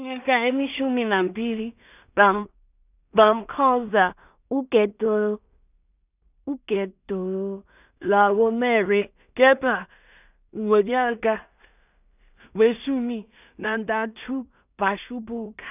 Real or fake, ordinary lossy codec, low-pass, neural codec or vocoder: fake; none; 3.6 kHz; codec, 16 kHz in and 24 kHz out, 0.4 kbps, LongCat-Audio-Codec, two codebook decoder